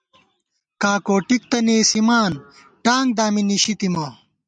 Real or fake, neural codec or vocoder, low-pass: real; none; 9.9 kHz